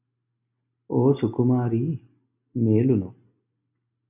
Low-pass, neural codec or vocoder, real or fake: 3.6 kHz; none; real